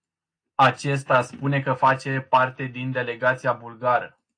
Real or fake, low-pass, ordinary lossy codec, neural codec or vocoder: real; 9.9 kHz; AAC, 48 kbps; none